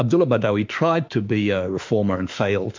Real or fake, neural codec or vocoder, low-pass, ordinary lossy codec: fake; autoencoder, 48 kHz, 32 numbers a frame, DAC-VAE, trained on Japanese speech; 7.2 kHz; MP3, 64 kbps